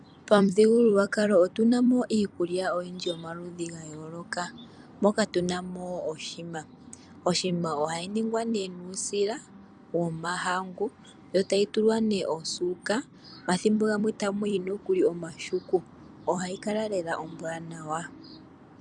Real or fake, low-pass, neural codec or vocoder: fake; 10.8 kHz; vocoder, 44.1 kHz, 128 mel bands every 256 samples, BigVGAN v2